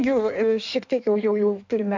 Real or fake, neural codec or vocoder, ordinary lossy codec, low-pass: fake; codec, 16 kHz in and 24 kHz out, 1.1 kbps, FireRedTTS-2 codec; MP3, 64 kbps; 7.2 kHz